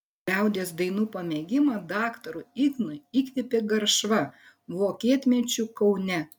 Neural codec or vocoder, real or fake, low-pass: none; real; 19.8 kHz